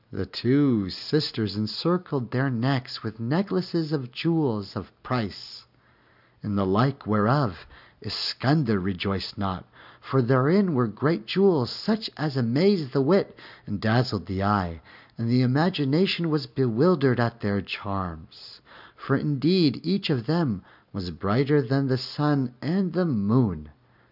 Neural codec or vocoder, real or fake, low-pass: none; real; 5.4 kHz